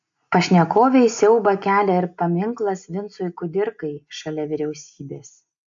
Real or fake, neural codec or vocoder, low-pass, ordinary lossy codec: real; none; 7.2 kHz; AAC, 64 kbps